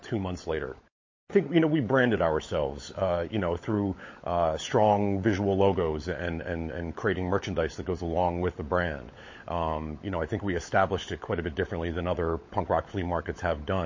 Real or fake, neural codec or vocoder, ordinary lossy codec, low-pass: fake; codec, 16 kHz, 16 kbps, FunCodec, trained on LibriTTS, 50 frames a second; MP3, 32 kbps; 7.2 kHz